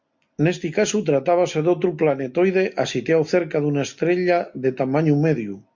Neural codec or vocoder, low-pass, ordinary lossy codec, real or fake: none; 7.2 kHz; MP3, 64 kbps; real